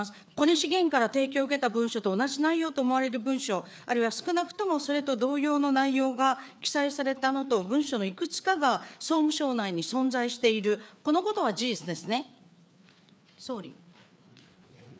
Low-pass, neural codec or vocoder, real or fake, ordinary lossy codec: none; codec, 16 kHz, 4 kbps, FreqCodec, larger model; fake; none